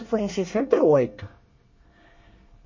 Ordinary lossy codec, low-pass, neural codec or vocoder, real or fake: MP3, 32 kbps; 7.2 kHz; codec, 24 kHz, 1 kbps, SNAC; fake